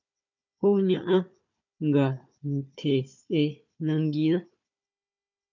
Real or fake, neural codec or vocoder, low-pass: fake; codec, 16 kHz, 4 kbps, FunCodec, trained on Chinese and English, 50 frames a second; 7.2 kHz